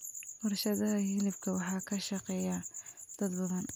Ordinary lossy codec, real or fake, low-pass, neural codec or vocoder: none; real; none; none